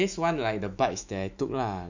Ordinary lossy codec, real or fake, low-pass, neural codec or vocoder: none; fake; 7.2 kHz; autoencoder, 48 kHz, 128 numbers a frame, DAC-VAE, trained on Japanese speech